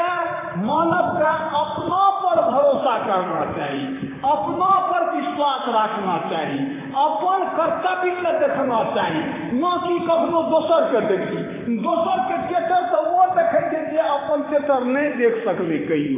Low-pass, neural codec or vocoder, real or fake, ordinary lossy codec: 3.6 kHz; vocoder, 44.1 kHz, 128 mel bands, Pupu-Vocoder; fake; AAC, 16 kbps